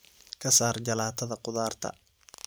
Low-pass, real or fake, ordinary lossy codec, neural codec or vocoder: none; fake; none; vocoder, 44.1 kHz, 128 mel bands every 512 samples, BigVGAN v2